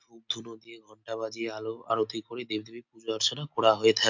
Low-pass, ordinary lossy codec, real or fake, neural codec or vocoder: 7.2 kHz; MP3, 48 kbps; real; none